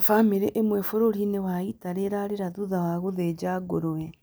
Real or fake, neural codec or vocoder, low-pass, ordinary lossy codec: real; none; none; none